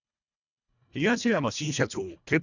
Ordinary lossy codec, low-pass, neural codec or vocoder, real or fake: none; 7.2 kHz; codec, 24 kHz, 1.5 kbps, HILCodec; fake